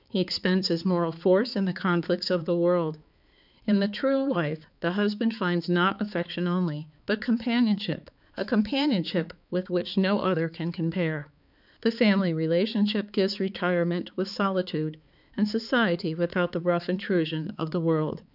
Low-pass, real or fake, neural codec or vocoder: 5.4 kHz; fake; codec, 16 kHz, 4 kbps, X-Codec, HuBERT features, trained on balanced general audio